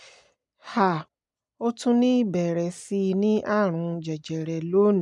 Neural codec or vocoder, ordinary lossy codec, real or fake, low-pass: none; none; real; 10.8 kHz